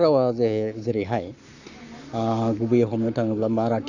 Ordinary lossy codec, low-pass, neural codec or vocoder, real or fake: none; 7.2 kHz; codec, 44.1 kHz, 7.8 kbps, Pupu-Codec; fake